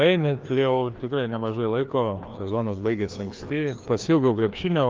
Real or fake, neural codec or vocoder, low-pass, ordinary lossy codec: fake; codec, 16 kHz, 2 kbps, FreqCodec, larger model; 7.2 kHz; Opus, 24 kbps